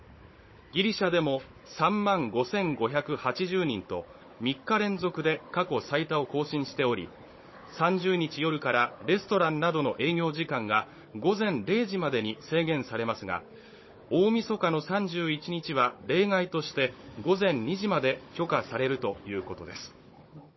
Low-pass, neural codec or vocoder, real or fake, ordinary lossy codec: 7.2 kHz; codec, 16 kHz, 16 kbps, FunCodec, trained on Chinese and English, 50 frames a second; fake; MP3, 24 kbps